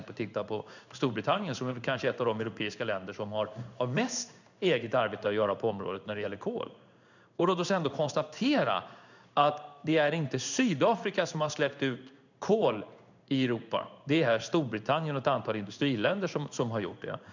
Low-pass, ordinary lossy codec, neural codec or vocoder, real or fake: 7.2 kHz; none; codec, 16 kHz in and 24 kHz out, 1 kbps, XY-Tokenizer; fake